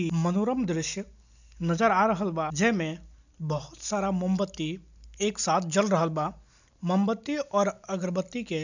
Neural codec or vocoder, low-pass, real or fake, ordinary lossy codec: none; 7.2 kHz; real; none